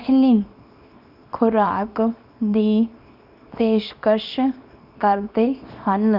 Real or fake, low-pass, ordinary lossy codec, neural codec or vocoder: fake; 5.4 kHz; none; codec, 24 kHz, 0.9 kbps, WavTokenizer, small release